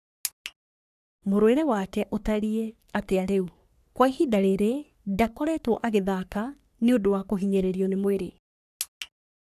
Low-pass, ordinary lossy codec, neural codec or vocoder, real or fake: 14.4 kHz; none; codec, 44.1 kHz, 3.4 kbps, Pupu-Codec; fake